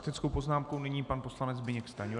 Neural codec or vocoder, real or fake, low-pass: none; real; 10.8 kHz